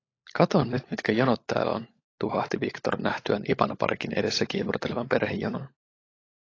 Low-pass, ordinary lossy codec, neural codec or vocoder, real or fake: 7.2 kHz; AAC, 32 kbps; codec, 16 kHz, 16 kbps, FunCodec, trained on LibriTTS, 50 frames a second; fake